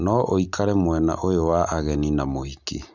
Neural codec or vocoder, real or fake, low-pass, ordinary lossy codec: none; real; 7.2 kHz; none